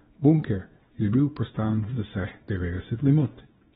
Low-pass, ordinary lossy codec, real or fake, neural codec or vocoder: 10.8 kHz; AAC, 16 kbps; fake; codec, 24 kHz, 0.9 kbps, WavTokenizer, small release